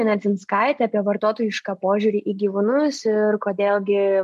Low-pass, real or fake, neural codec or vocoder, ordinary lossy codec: 14.4 kHz; real; none; MP3, 64 kbps